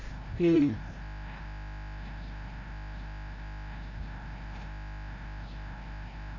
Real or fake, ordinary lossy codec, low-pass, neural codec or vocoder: fake; AAC, 32 kbps; 7.2 kHz; codec, 16 kHz, 0.5 kbps, FreqCodec, larger model